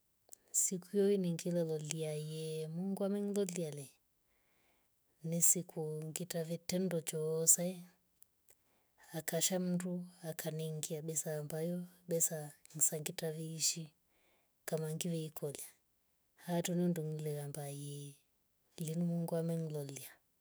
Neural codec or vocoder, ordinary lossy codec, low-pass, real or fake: autoencoder, 48 kHz, 128 numbers a frame, DAC-VAE, trained on Japanese speech; none; none; fake